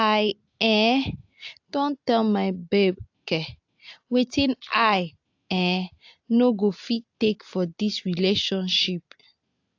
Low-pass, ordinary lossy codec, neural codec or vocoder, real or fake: 7.2 kHz; AAC, 48 kbps; none; real